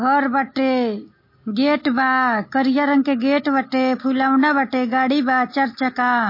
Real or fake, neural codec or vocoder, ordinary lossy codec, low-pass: real; none; MP3, 24 kbps; 5.4 kHz